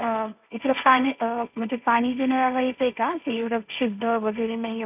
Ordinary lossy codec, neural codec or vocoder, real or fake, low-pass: none; codec, 16 kHz, 1.1 kbps, Voila-Tokenizer; fake; 3.6 kHz